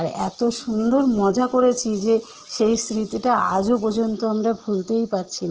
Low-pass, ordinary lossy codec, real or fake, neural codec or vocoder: 7.2 kHz; Opus, 16 kbps; real; none